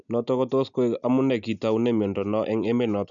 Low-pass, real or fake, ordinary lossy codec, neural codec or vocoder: 7.2 kHz; real; none; none